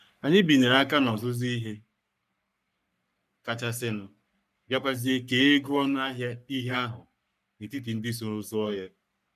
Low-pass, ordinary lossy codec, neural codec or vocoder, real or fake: 14.4 kHz; none; codec, 44.1 kHz, 3.4 kbps, Pupu-Codec; fake